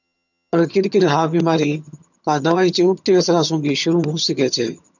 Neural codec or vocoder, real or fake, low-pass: vocoder, 22.05 kHz, 80 mel bands, HiFi-GAN; fake; 7.2 kHz